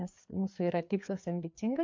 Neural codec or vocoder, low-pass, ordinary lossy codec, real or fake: codec, 16 kHz, 4 kbps, FreqCodec, larger model; 7.2 kHz; MP3, 48 kbps; fake